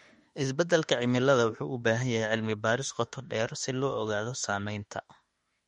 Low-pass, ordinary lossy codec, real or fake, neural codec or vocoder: 19.8 kHz; MP3, 48 kbps; fake; autoencoder, 48 kHz, 32 numbers a frame, DAC-VAE, trained on Japanese speech